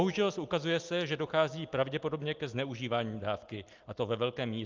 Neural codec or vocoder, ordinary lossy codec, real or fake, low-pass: none; Opus, 32 kbps; real; 7.2 kHz